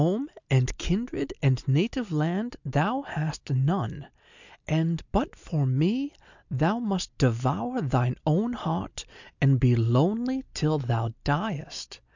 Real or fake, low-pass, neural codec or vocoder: real; 7.2 kHz; none